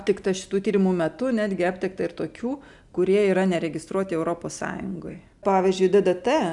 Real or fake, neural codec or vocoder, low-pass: real; none; 10.8 kHz